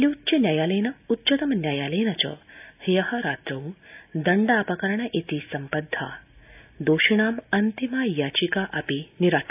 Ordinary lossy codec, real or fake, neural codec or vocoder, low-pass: AAC, 32 kbps; real; none; 3.6 kHz